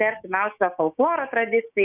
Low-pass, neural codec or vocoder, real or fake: 3.6 kHz; codec, 16 kHz, 6 kbps, DAC; fake